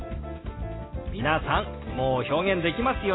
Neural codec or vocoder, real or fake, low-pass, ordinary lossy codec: none; real; 7.2 kHz; AAC, 16 kbps